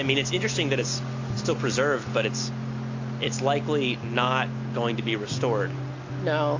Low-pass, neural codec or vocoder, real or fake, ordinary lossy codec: 7.2 kHz; none; real; AAC, 48 kbps